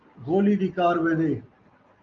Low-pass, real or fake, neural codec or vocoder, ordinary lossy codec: 7.2 kHz; real; none; Opus, 32 kbps